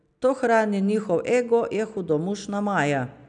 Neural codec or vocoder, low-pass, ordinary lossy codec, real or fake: none; 9.9 kHz; none; real